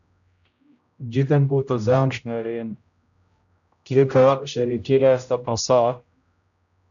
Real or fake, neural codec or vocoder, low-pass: fake; codec, 16 kHz, 0.5 kbps, X-Codec, HuBERT features, trained on general audio; 7.2 kHz